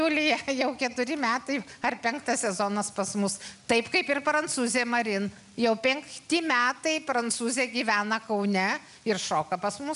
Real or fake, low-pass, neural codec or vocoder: real; 10.8 kHz; none